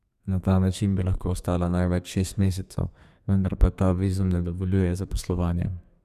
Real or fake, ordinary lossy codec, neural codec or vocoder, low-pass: fake; none; codec, 32 kHz, 1.9 kbps, SNAC; 14.4 kHz